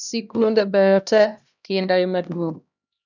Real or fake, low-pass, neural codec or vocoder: fake; 7.2 kHz; codec, 16 kHz, 1 kbps, X-Codec, HuBERT features, trained on LibriSpeech